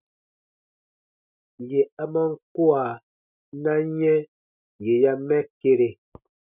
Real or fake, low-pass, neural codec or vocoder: real; 3.6 kHz; none